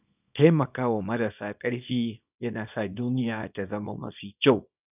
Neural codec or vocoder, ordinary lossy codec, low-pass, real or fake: codec, 24 kHz, 0.9 kbps, WavTokenizer, small release; none; 3.6 kHz; fake